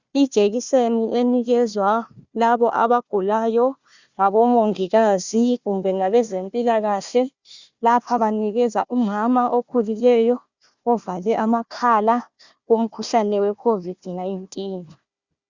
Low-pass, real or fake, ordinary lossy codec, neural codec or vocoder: 7.2 kHz; fake; Opus, 64 kbps; codec, 16 kHz, 1 kbps, FunCodec, trained on Chinese and English, 50 frames a second